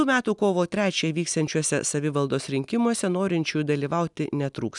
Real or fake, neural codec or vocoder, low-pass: real; none; 10.8 kHz